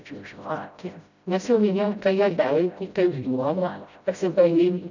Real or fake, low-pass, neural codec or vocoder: fake; 7.2 kHz; codec, 16 kHz, 0.5 kbps, FreqCodec, smaller model